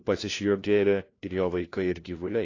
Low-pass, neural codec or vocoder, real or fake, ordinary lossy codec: 7.2 kHz; codec, 16 kHz, 1 kbps, FunCodec, trained on LibriTTS, 50 frames a second; fake; AAC, 32 kbps